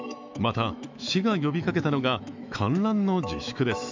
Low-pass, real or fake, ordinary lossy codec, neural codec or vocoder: 7.2 kHz; fake; MP3, 64 kbps; autoencoder, 48 kHz, 128 numbers a frame, DAC-VAE, trained on Japanese speech